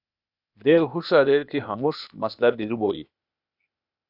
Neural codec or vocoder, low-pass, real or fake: codec, 16 kHz, 0.8 kbps, ZipCodec; 5.4 kHz; fake